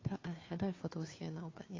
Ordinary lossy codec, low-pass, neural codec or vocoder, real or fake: AAC, 32 kbps; 7.2 kHz; codec, 16 kHz, 2 kbps, FunCodec, trained on Chinese and English, 25 frames a second; fake